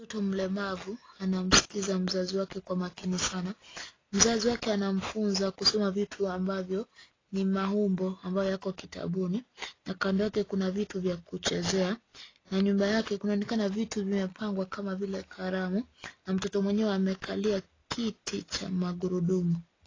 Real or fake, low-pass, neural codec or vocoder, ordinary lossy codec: real; 7.2 kHz; none; AAC, 32 kbps